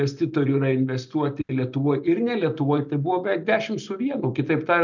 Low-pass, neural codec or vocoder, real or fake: 7.2 kHz; none; real